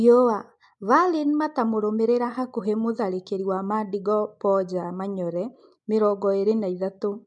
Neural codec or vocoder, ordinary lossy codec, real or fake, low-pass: none; MP3, 64 kbps; real; 10.8 kHz